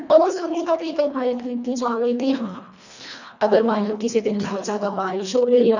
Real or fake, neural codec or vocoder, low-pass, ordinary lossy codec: fake; codec, 24 kHz, 1.5 kbps, HILCodec; 7.2 kHz; none